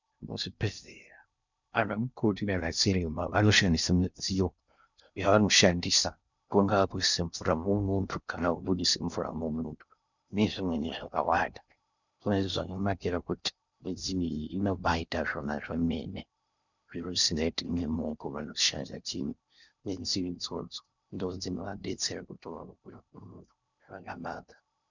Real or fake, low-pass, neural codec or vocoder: fake; 7.2 kHz; codec, 16 kHz in and 24 kHz out, 0.6 kbps, FocalCodec, streaming, 2048 codes